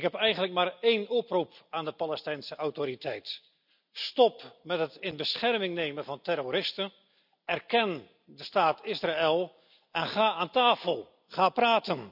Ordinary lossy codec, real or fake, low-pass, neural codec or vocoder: none; real; 5.4 kHz; none